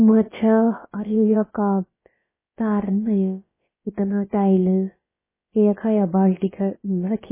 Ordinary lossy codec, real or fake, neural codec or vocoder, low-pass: MP3, 16 kbps; fake; codec, 16 kHz, about 1 kbps, DyCAST, with the encoder's durations; 3.6 kHz